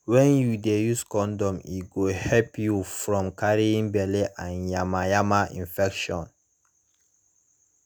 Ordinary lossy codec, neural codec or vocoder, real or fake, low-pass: none; none; real; none